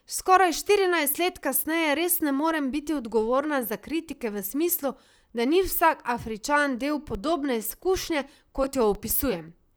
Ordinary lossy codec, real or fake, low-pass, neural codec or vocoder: none; fake; none; vocoder, 44.1 kHz, 128 mel bands, Pupu-Vocoder